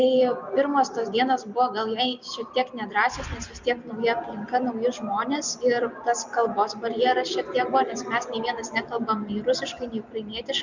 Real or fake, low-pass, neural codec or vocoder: real; 7.2 kHz; none